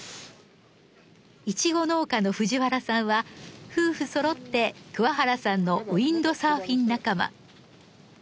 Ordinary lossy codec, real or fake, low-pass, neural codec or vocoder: none; real; none; none